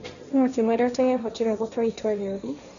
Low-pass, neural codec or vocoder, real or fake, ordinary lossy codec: 7.2 kHz; codec, 16 kHz, 1.1 kbps, Voila-Tokenizer; fake; none